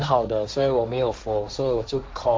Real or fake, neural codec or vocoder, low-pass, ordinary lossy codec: fake; codec, 16 kHz, 1.1 kbps, Voila-Tokenizer; none; none